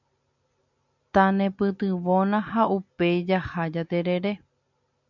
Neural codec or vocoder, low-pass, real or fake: none; 7.2 kHz; real